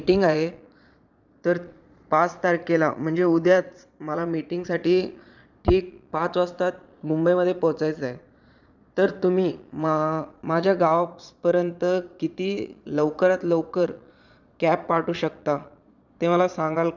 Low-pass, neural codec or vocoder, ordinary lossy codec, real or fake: 7.2 kHz; vocoder, 22.05 kHz, 80 mel bands, Vocos; none; fake